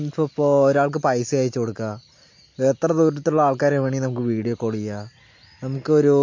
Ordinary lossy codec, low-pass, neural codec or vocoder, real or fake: MP3, 48 kbps; 7.2 kHz; none; real